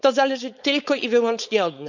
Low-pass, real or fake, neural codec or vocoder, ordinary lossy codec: 7.2 kHz; fake; codec, 16 kHz, 4.8 kbps, FACodec; none